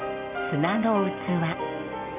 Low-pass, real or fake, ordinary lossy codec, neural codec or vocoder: 3.6 kHz; real; none; none